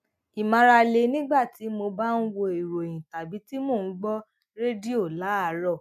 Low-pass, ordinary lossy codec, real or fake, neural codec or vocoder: 14.4 kHz; none; real; none